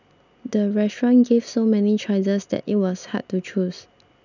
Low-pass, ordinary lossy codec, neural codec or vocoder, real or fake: 7.2 kHz; none; none; real